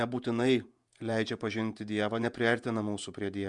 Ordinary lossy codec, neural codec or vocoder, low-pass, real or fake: Opus, 64 kbps; vocoder, 48 kHz, 128 mel bands, Vocos; 10.8 kHz; fake